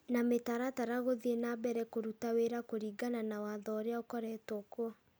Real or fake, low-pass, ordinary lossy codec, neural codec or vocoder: real; none; none; none